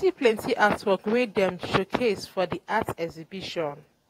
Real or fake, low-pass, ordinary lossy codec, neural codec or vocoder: fake; 19.8 kHz; AAC, 48 kbps; vocoder, 48 kHz, 128 mel bands, Vocos